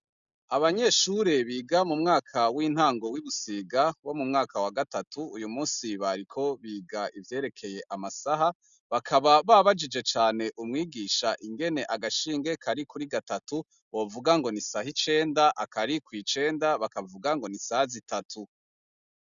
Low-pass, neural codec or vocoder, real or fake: 7.2 kHz; none; real